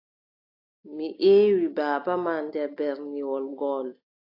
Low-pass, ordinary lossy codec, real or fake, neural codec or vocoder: 5.4 kHz; AAC, 32 kbps; real; none